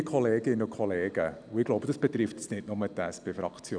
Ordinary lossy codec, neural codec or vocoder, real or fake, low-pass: none; none; real; 9.9 kHz